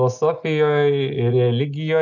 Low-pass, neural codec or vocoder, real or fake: 7.2 kHz; none; real